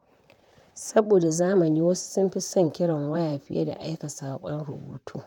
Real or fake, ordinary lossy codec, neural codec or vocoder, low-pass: fake; none; vocoder, 44.1 kHz, 128 mel bands, Pupu-Vocoder; 19.8 kHz